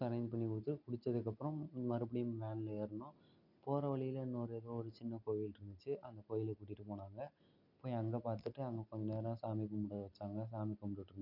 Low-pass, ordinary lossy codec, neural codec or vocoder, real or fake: 5.4 kHz; none; none; real